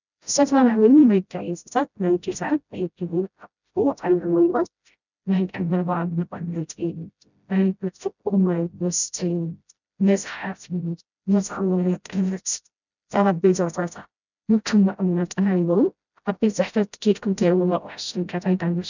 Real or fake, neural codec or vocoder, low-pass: fake; codec, 16 kHz, 0.5 kbps, FreqCodec, smaller model; 7.2 kHz